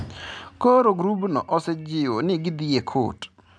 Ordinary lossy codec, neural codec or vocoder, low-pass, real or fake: none; none; 9.9 kHz; real